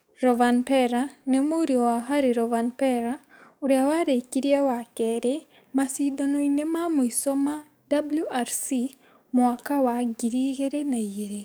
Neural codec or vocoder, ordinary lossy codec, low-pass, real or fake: codec, 44.1 kHz, 7.8 kbps, DAC; none; none; fake